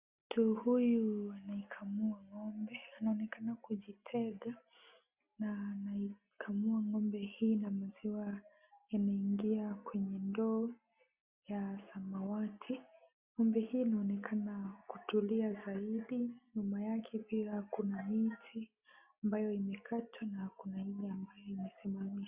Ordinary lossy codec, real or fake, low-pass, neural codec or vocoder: Opus, 64 kbps; real; 3.6 kHz; none